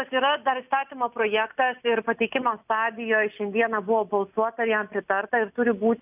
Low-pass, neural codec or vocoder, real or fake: 3.6 kHz; none; real